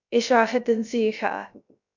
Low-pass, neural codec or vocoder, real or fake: 7.2 kHz; codec, 16 kHz, 0.3 kbps, FocalCodec; fake